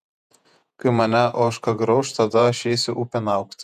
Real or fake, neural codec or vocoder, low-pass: fake; vocoder, 48 kHz, 128 mel bands, Vocos; 14.4 kHz